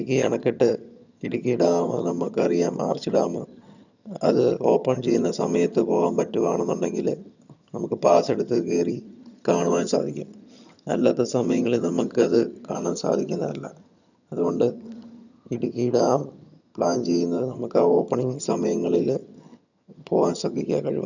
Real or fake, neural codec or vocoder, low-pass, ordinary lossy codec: fake; vocoder, 22.05 kHz, 80 mel bands, HiFi-GAN; 7.2 kHz; none